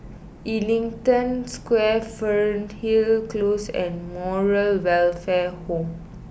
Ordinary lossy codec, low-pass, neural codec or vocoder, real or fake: none; none; none; real